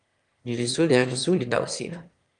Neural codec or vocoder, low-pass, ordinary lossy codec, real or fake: autoencoder, 22.05 kHz, a latent of 192 numbers a frame, VITS, trained on one speaker; 9.9 kHz; Opus, 32 kbps; fake